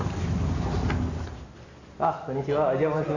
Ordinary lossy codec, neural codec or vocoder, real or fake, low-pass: none; none; real; 7.2 kHz